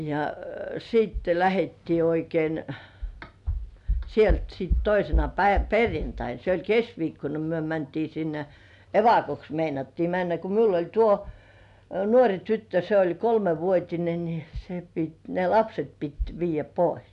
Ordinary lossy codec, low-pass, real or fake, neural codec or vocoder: none; 10.8 kHz; real; none